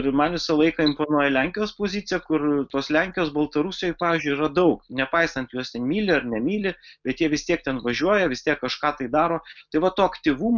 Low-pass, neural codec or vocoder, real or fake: 7.2 kHz; none; real